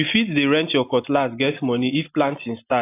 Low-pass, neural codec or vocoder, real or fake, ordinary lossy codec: 3.6 kHz; none; real; AAC, 24 kbps